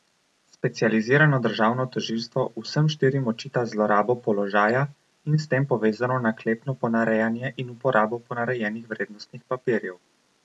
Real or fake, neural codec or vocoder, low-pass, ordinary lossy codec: real; none; none; none